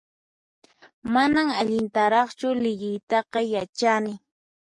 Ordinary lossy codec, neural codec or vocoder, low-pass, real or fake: MP3, 48 kbps; codec, 44.1 kHz, 7.8 kbps, DAC; 10.8 kHz; fake